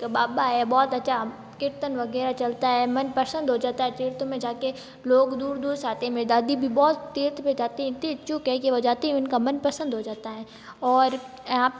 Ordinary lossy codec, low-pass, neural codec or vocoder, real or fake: none; none; none; real